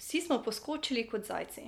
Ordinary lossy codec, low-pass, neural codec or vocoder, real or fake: MP3, 96 kbps; 19.8 kHz; none; real